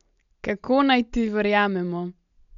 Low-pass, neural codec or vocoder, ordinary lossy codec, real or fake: 7.2 kHz; none; none; real